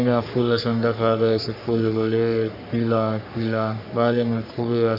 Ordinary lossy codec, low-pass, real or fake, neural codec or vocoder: none; 5.4 kHz; fake; codec, 44.1 kHz, 3.4 kbps, Pupu-Codec